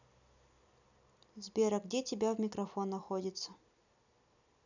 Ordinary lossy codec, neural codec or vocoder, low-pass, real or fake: none; none; 7.2 kHz; real